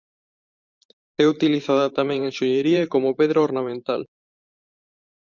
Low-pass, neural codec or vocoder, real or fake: 7.2 kHz; vocoder, 44.1 kHz, 128 mel bands every 512 samples, BigVGAN v2; fake